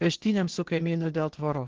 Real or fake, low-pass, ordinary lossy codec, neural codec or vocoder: fake; 7.2 kHz; Opus, 16 kbps; codec, 16 kHz, 0.8 kbps, ZipCodec